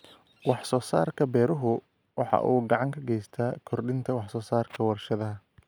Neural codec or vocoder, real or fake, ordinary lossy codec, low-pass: none; real; none; none